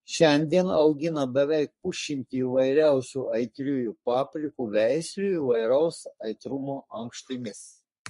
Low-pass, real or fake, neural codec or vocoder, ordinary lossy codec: 14.4 kHz; fake; codec, 44.1 kHz, 3.4 kbps, Pupu-Codec; MP3, 48 kbps